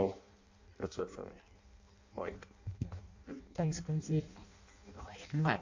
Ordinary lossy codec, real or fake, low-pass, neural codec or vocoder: AAC, 48 kbps; fake; 7.2 kHz; codec, 16 kHz in and 24 kHz out, 0.6 kbps, FireRedTTS-2 codec